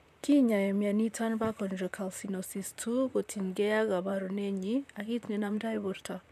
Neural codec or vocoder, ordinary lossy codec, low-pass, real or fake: vocoder, 44.1 kHz, 128 mel bands, Pupu-Vocoder; none; 14.4 kHz; fake